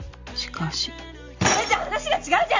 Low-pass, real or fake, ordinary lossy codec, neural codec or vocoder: 7.2 kHz; real; MP3, 64 kbps; none